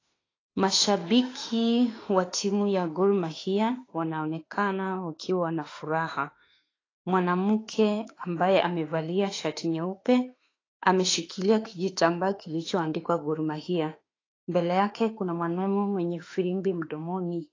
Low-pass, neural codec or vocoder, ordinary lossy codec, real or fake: 7.2 kHz; autoencoder, 48 kHz, 32 numbers a frame, DAC-VAE, trained on Japanese speech; AAC, 32 kbps; fake